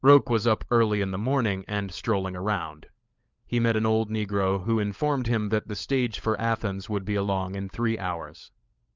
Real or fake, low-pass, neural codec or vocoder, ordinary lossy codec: fake; 7.2 kHz; codec, 16 kHz, 16 kbps, FunCodec, trained on LibriTTS, 50 frames a second; Opus, 24 kbps